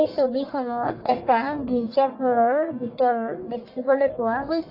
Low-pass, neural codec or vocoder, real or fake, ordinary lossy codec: 5.4 kHz; codec, 44.1 kHz, 1.7 kbps, Pupu-Codec; fake; AAC, 32 kbps